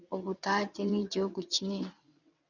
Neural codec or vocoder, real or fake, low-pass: vocoder, 22.05 kHz, 80 mel bands, WaveNeXt; fake; 7.2 kHz